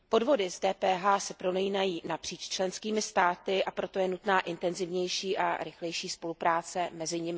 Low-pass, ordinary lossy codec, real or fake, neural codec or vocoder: none; none; real; none